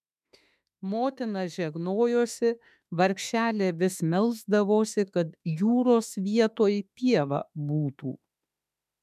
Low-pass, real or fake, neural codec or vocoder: 14.4 kHz; fake; autoencoder, 48 kHz, 32 numbers a frame, DAC-VAE, trained on Japanese speech